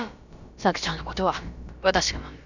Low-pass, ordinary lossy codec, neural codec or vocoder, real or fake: 7.2 kHz; none; codec, 16 kHz, about 1 kbps, DyCAST, with the encoder's durations; fake